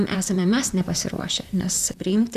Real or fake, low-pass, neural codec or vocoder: fake; 14.4 kHz; vocoder, 44.1 kHz, 128 mel bands, Pupu-Vocoder